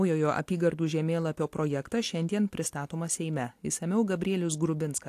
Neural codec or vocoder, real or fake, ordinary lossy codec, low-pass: none; real; AAC, 64 kbps; 14.4 kHz